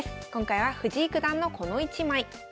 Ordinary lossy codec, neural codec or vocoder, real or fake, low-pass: none; none; real; none